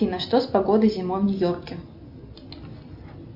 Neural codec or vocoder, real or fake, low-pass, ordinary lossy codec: none; real; 5.4 kHz; AAC, 48 kbps